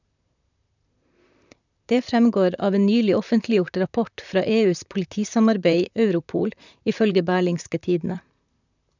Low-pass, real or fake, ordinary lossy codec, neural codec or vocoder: 7.2 kHz; fake; none; vocoder, 44.1 kHz, 128 mel bands, Pupu-Vocoder